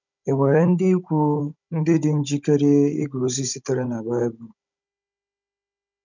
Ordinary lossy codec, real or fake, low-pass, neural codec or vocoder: none; fake; 7.2 kHz; codec, 16 kHz, 16 kbps, FunCodec, trained on Chinese and English, 50 frames a second